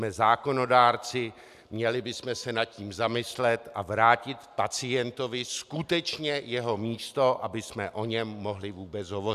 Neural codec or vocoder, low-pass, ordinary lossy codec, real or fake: none; 14.4 kHz; AAC, 96 kbps; real